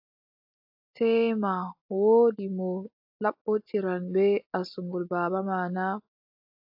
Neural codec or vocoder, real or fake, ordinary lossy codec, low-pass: none; real; AAC, 48 kbps; 5.4 kHz